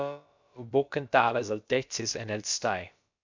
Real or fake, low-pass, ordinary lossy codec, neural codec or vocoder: fake; 7.2 kHz; MP3, 64 kbps; codec, 16 kHz, about 1 kbps, DyCAST, with the encoder's durations